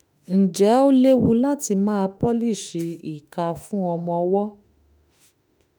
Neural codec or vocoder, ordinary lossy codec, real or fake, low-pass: autoencoder, 48 kHz, 32 numbers a frame, DAC-VAE, trained on Japanese speech; none; fake; none